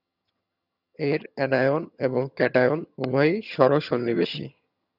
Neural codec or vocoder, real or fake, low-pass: vocoder, 22.05 kHz, 80 mel bands, HiFi-GAN; fake; 5.4 kHz